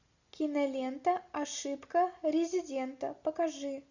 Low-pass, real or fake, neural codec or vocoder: 7.2 kHz; real; none